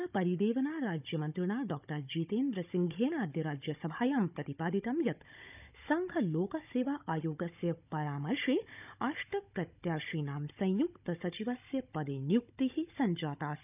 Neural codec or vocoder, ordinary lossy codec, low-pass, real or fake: codec, 16 kHz, 16 kbps, FunCodec, trained on Chinese and English, 50 frames a second; none; 3.6 kHz; fake